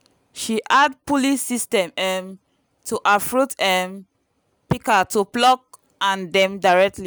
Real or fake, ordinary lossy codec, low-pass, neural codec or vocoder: real; none; none; none